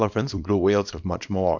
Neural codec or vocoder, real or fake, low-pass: codec, 24 kHz, 0.9 kbps, WavTokenizer, small release; fake; 7.2 kHz